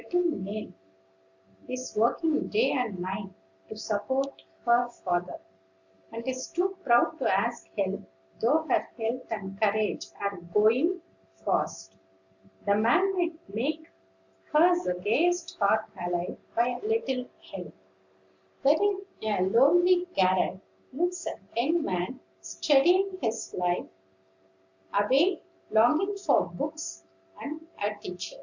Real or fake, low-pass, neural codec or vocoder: real; 7.2 kHz; none